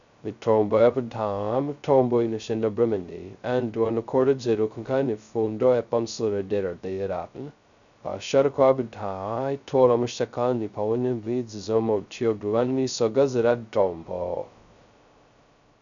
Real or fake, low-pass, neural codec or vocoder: fake; 7.2 kHz; codec, 16 kHz, 0.2 kbps, FocalCodec